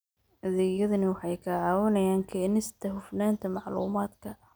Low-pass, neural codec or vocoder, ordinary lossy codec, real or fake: none; none; none; real